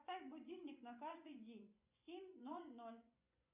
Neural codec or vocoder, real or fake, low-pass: vocoder, 24 kHz, 100 mel bands, Vocos; fake; 3.6 kHz